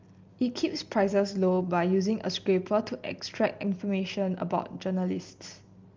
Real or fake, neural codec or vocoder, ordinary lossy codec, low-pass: real; none; Opus, 32 kbps; 7.2 kHz